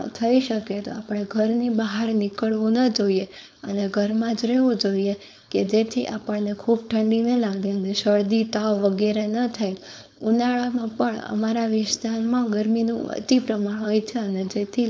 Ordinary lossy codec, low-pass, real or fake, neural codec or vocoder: none; none; fake; codec, 16 kHz, 4.8 kbps, FACodec